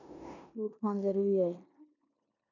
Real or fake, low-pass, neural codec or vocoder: fake; 7.2 kHz; codec, 16 kHz in and 24 kHz out, 0.9 kbps, LongCat-Audio-Codec, four codebook decoder